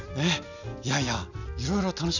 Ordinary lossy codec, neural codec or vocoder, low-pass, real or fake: none; none; 7.2 kHz; real